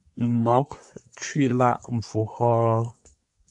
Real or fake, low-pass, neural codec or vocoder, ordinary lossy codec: fake; 10.8 kHz; codec, 24 kHz, 1 kbps, SNAC; AAC, 64 kbps